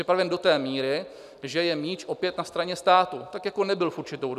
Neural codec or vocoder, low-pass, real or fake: none; 14.4 kHz; real